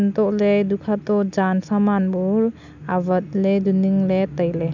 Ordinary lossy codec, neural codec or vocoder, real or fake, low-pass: none; none; real; 7.2 kHz